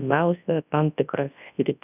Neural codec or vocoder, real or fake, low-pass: codec, 24 kHz, 0.9 kbps, WavTokenizer, large speech release; fake; 3.6 kHz